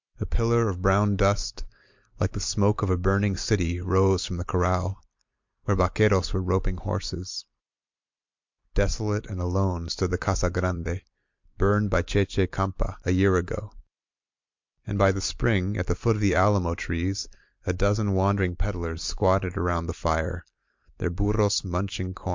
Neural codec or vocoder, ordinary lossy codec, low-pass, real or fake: vocoder, 44.1 kHz, 128 mel bands every 256 samples, BigVGAN v2; MP3, 64 kbps; 7.2 kHz; fake